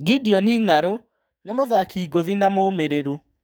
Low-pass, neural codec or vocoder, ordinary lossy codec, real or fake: none; codec, 44.1 kHz, 2.6 kbps, SNAC; none; fake